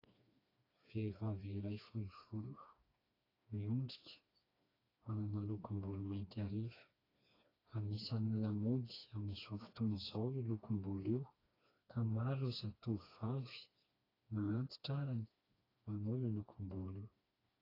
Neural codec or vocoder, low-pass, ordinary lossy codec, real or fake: codec, 16 kHz, 2 kbps, FreqCodec, smaller model; 5.4 kHz; AAC, 24 kbps; fake